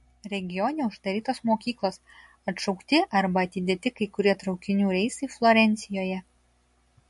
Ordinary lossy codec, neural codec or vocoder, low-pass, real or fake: MP3, 48 kbps; none; 14.4 kHz; real